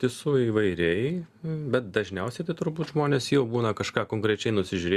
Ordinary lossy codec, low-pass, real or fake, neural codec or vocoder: Opus, 64 kbps; 14.4 kHz; real; none